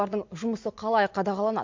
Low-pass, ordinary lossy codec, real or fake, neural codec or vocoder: 7.2 kHz; MP3, 48 kbps; real; none